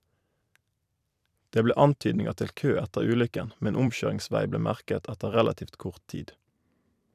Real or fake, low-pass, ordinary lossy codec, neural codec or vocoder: fake; 14.4 kHz; none; vocoder, 44.1 kHz, 128 mel bands every 256 samples, BigVGAN v2